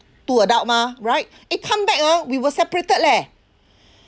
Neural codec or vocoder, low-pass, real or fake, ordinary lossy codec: none; none; real; none